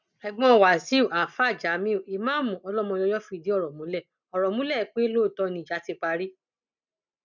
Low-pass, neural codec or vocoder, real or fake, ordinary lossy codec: 7.2 kHz; none; real; none